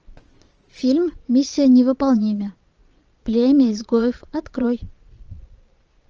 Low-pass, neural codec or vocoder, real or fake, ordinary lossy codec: 7.2 kHz; vocoder, 22.05 kHz, 80 mel bands, Vocos; fake; Opus, 24 kbps